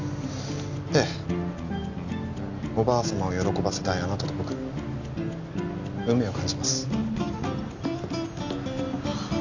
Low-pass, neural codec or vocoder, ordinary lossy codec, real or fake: 7.2 kHz; none; none; real